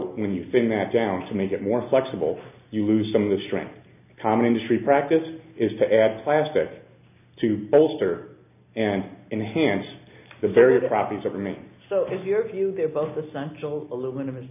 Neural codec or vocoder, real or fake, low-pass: none; real; 3.6 kHz